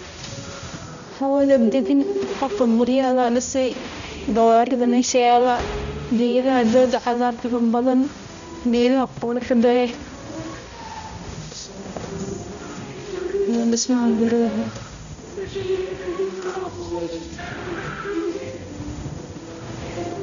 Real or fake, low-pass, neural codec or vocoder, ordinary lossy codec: fake; 7.2 kHz; codec, 16 kHz, 0.5 kbps, X-Codec, HuBERT features, trained on balanced general audio; none